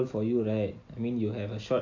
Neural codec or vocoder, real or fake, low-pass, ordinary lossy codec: none; real; 7.2 kHz; AAC, 48 kbps